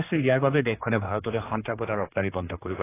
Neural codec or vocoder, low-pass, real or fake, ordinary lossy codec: codec, 16 kHz, 2 kbps, X-Codec, HuBERT features, trained on general audio; 3.6 kHz; fake; AAC, 16 kbps